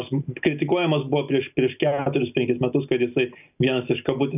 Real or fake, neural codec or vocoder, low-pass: real; none; 3.6 kHz